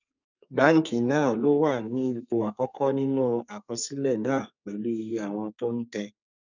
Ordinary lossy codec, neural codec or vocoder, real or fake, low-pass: none; codec, 32 kHz, 1.9 kbps, SNAC; fake; 7.2 kHz